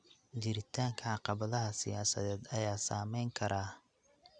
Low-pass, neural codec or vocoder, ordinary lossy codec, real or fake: 9.9 kHz; none; none; real